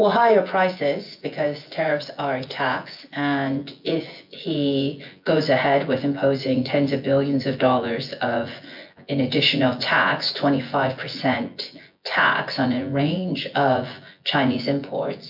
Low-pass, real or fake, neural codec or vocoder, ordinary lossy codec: 5.4 kHz; fake; vocoder, 24 kHz, 100 mel bands, Vocos; MP3, 48 kbps